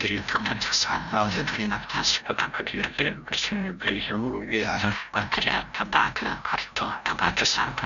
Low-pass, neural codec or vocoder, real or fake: 7.2 kHz; codec, 16 kHz, 0.5 kbps, FreqCodec, larger model; fake